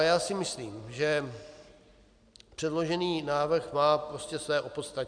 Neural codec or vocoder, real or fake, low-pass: none; real; 9.9 kHz